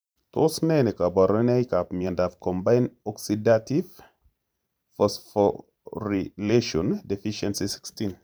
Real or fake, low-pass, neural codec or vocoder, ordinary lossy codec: fake; none; vocoder, 44.1 kHz, 128 mel bands every 512 samples, BigVGAN v2; none